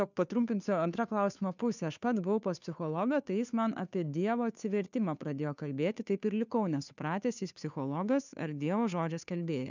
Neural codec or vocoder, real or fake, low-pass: codec, 16 kHz, 2 kbps, FunCodec, trained on Chinese and English, 25 frames a second; fake; 7.2 kHz